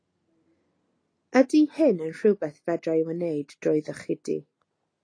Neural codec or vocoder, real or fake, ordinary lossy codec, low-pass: none; real; AAC, 32 kbps; 9.9 kHz